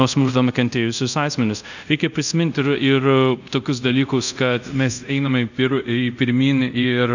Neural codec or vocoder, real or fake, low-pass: codec, 24 kHz, 0.5 kbps, DualCodec; fake; 7.2 kHz